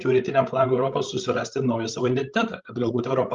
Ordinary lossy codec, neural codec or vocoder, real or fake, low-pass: Opus, 32 kbps; codec, 16 kHz, 16 kbps, FreqCodec, larger model; fake; 7.2 kHz